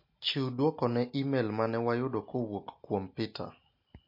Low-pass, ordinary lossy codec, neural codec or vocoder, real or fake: 5.4 kHz; MP3, 32 kbps; none; real